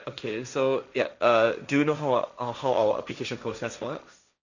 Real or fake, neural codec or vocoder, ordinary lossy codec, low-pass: fake; codec, 16 kHz, 1.1 kbps, Voila-Tokenizer; none; 7.2 kHz